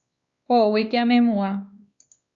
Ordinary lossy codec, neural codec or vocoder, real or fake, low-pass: Opus, 64 kbps; codec, 16 kHz, 2 kbps, X-Codec, WavLM features, trained on Multilingual LibriSpeech; fake; 7.2 kHz